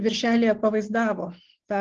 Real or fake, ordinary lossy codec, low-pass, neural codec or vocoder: real; Opus, 16 kbps; 7.2 kHz; none